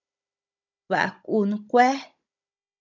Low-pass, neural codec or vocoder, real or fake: 7.2 kHz; codec, 16 kHz, 16 kbps, FunCodec, trained on Chinese and English, 50 frames a second; fake